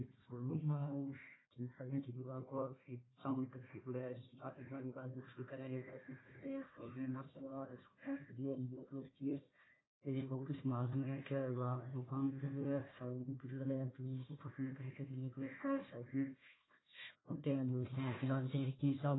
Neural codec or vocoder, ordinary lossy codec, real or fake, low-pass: codec, 16 kHz, 1 kbps, FunCodec, trained on Chinese and English, 50 frames a second; AAC, 16 kbps; fake; 7.2 kHz